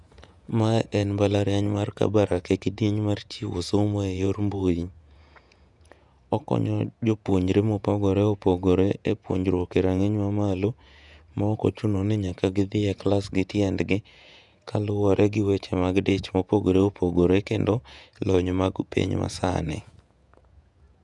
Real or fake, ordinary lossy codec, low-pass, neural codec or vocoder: fake; none; 10.8 kHz; vocoder, 48 kHz, 128 mel bands, Vocos